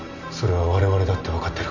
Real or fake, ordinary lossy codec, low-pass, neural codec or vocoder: real; none; 7.2 kHz; none